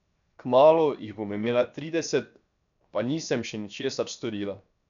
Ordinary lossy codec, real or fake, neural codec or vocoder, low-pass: none; fake; codec, 16 kHz, 0.7 kbps, FocalCodec; 7.2 kHz